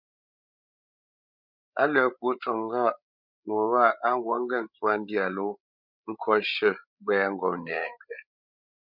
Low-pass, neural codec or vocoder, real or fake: 5.4 kHz; codec, 16 kHz, 8 kbps, FreqCodec, larger model; fake